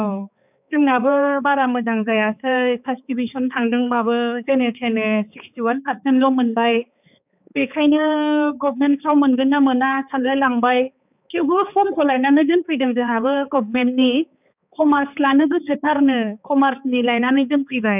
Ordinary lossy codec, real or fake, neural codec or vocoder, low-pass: none; fake; codec, 16 kHz, 4 kbps, X-Codec, HuBERT features, trained on balanced general audio; 3.6 kHz